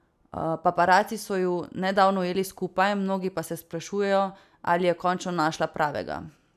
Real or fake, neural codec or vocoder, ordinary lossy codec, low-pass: real; none; none; 14.4 kHz